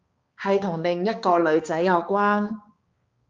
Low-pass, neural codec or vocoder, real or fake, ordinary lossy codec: 7.2 kHz; codec, 16 kHz, 2 kbps, X-Codec, HuBERT features, trained on balanced general audio; fake; Opus, 24 kbps